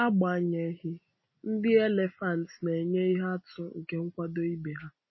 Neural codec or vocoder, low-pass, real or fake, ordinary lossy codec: none; 7.2 kHz; real; MP3, 24 kbps